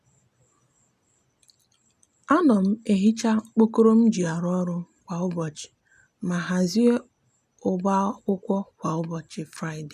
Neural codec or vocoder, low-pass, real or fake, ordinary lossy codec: none; 14.4 kHz; real; none